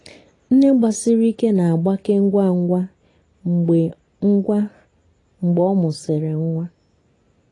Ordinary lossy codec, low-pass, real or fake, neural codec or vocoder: AAC, 32 kbps; 10.8 kHz; real; none